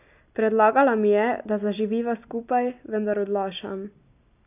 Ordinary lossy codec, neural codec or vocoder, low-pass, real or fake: none; none; 3.6 kHz; real